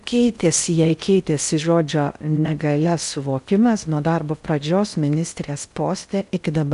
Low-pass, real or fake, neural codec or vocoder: 10.8 kHz; fake; codec, 16 kHz in and 24 kHz out, 0.6 kbps, FocalCodec, streaming, 4096 codes